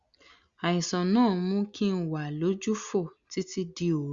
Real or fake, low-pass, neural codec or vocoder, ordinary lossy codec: real; 7.2 kHz; none; Opus, 64 kbps